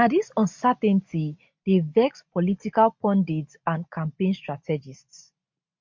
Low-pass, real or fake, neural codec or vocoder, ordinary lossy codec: 7.2 kHz; real; none; MP3, 48 kbps